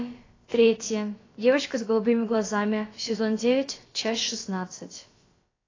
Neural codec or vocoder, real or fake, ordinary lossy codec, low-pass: codec, 16 kHz, about 1 kbps, DyCAST, with the encoder's durations; fake; AAC, 32 kbps; 7.2 kHz